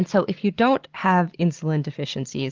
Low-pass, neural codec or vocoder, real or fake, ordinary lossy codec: 7.2 kHz; none; real; Opus, 16 kbps